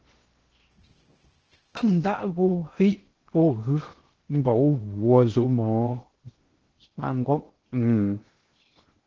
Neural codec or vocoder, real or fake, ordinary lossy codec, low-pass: codec, 16 kHz in and 24 kHz out, 0.6 kbps, FocalCodec, streaming, 2048 codes; fake; Opus, 16 kbps; 7.2 kHz